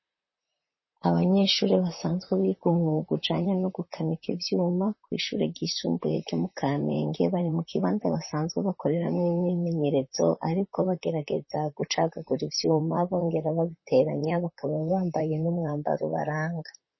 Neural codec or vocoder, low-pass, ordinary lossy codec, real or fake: vocoder, 44.1 kHz, 128 mel bands, Pupu-Vocoder; 7.2 kHz; MP3, 24 kbps; fake